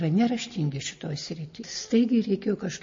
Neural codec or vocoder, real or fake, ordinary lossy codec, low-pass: none; real; MP3, 32 kbps; 7.2 kHz